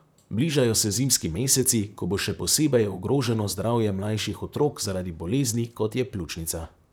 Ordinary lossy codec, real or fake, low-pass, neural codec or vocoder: none; fake; none; vocoder, 44.1 kHz, 128 mel bands, Pupu-Vocoder